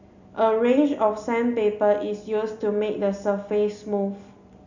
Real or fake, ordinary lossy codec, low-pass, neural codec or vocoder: real; none; 7.2 kHz; none